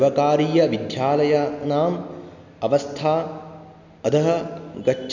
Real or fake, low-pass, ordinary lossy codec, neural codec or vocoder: real; 7.2 kHz; none; none